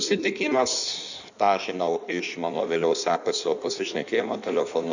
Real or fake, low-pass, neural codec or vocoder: fake; 7.2 kHz; codec, 16 kHz in and 24 kHz out, 1.1 kbps, FireRedTTS-2 codec